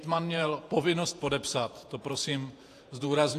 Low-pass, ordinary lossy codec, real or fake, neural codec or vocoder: 14.4 kHz; AAC, 64 kbps; fake; vocoder, 48 kHz, 128 mel bands, Vocos